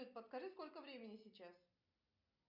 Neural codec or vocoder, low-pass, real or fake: none; 5.4 kHz; real